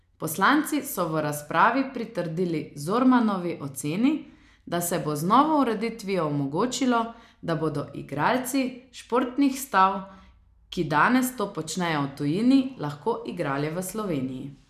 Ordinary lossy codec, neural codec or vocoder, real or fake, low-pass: none; none; real; 14.4 kHz